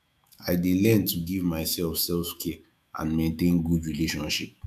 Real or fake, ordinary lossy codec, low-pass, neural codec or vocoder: fake; none; 14.4 kHz; autoencoder, 48 kHz, 128 numbers a frame, DAC-VAE, trained on Japanese speech